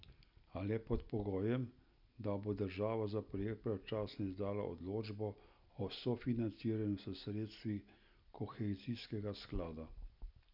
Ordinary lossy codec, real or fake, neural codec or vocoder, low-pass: none; real; none; 5.4 kHz